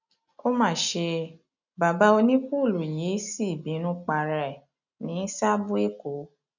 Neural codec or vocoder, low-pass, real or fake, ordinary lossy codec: none; 7.2 kHz; real; none